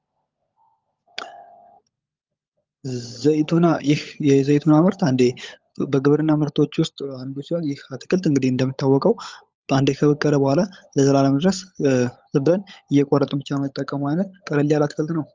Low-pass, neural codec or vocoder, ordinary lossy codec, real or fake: 7.2 kHz; codec, 16 kHz, 16 kbps, FunCodec, trained on LibriTTS, 50 frames a second; Opus, 32 kbps; fake